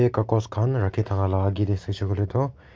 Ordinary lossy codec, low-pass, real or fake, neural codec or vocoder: none; none; real; none